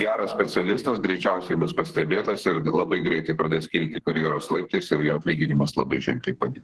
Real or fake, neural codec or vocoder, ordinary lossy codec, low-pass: fake; codec, 32 kHz, 1.9 kbps, SNAC; Opus, 16 kbps; 10.8 kHz